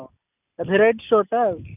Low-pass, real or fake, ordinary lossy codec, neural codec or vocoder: 3.6 kHz; real; none; none